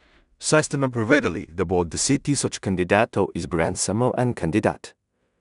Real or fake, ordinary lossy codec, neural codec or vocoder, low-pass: fake; none; codec, 16 kHz in and 24 kHz out, 0.4 kbps, LongCat-Audio-Codec, two codebook decoder; 10.8 kHz